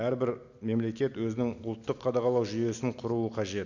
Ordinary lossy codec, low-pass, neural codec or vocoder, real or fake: none; 7.2 kHz; none; real